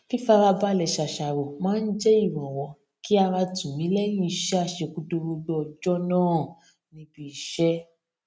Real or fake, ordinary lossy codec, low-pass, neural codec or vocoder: real; none; none; none